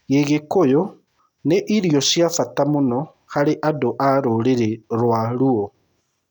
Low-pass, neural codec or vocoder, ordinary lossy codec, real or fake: 19.8 kHz; none; none; real